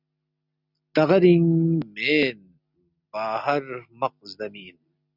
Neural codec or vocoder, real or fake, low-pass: none; real; 5.4 kHz